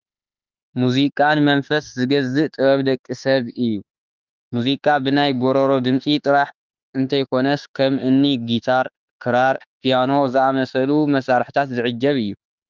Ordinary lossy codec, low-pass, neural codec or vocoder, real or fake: Opus, 24 kbps; 7.2 kHz; autoencoder, 48 kHz, 32 numbers a frame, DAC-VAE, trained on Japanese speech; fake